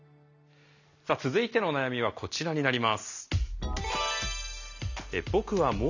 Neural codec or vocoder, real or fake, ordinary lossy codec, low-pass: none; real; none; 7.2 kHz